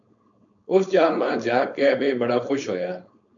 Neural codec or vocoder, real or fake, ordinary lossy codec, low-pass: codec, 16 kHz, 4.8 kbps, FACodec; fake; AAC, 64 kbps; 7.2 kHz